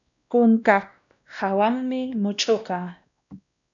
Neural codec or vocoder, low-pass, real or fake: codec, 16 kHz, 1 kbps, X-Codec, WavLM features, trained on Multilingual LibriSpeech; 7.2 kHz; fake